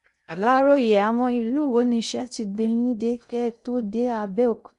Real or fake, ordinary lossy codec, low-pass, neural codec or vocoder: fake; none; 10.8 kHz; codec, 16 kHz in and 24 kHz out, 0.6 kbps, FocalCodec, streaming, 2048 codes